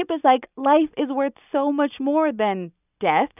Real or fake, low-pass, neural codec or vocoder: real; 3.6 kHz; none